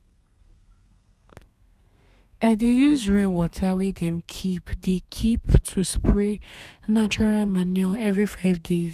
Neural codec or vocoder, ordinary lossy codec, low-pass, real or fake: codec, 32 kHz, 1.9 kbps, SNAC; none; 14.4 kHz; fake